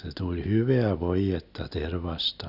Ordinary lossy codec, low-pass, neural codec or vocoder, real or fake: none; 5.4 kHz; none; real